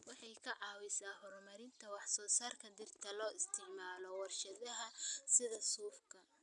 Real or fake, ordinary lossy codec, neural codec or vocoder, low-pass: real; none; none; 10.8 kHz